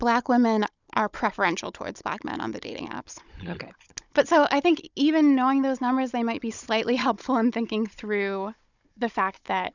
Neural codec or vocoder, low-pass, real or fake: codec, 16 kHz, 16 kbps, FunCodec, trained on Chinese and English, 50 frames a second; 7.2 kHz; fake